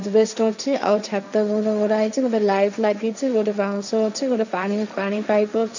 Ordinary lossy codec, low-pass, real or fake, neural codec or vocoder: none; 7.2 kHz; fake; codec, 16 kHz, 1.1 kbps, Voila-Tokenizer